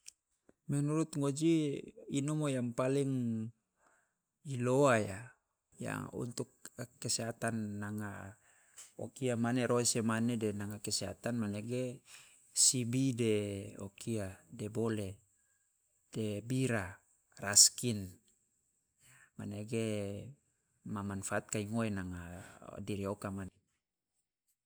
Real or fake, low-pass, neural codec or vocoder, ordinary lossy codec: real; none; none; none